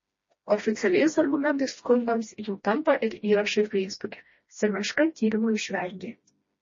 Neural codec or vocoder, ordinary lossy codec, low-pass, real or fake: codec, 16 kHz, 1 kbps, FreqCodec, smaller model; MP3, 32 kbps; 7.2 kHz; fake